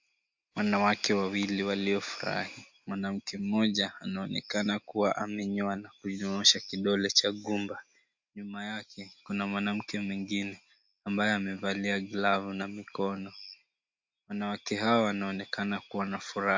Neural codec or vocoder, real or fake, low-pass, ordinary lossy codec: none; real; 7.2 kHz; MP3, 48 kbps